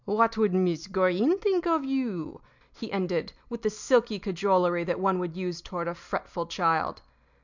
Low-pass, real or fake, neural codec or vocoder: 7.2 kHz; real; none